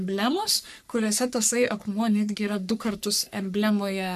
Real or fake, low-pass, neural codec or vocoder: fake; 14.4 kHz; codec, 44.1 kHz, 3.4 kbps, Pupu-Codec